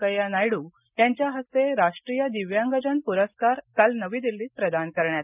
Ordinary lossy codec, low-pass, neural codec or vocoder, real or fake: none; 3.6 kHz; none; real